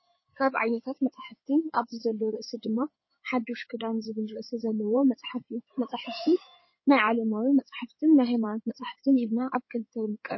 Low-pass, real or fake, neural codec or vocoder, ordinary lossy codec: 7.2 kHz; fake; codec, 16 kHz in and 24 kHz out, 2.2 kbps, FireRedTTS-2 codec; MP3, 24 kbps